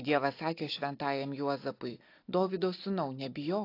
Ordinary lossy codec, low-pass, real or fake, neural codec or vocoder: AAC, 32 kbps; 5.4 kHz; real; none